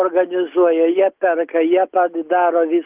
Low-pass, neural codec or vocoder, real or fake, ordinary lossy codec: 3.6 kHz; none; real; Opus, 32 kbps